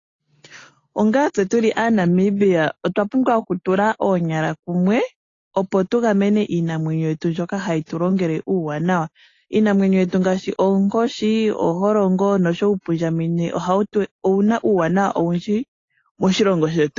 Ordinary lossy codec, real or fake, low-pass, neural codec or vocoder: AAC, 32 kbps; real; 7.2 kHz; none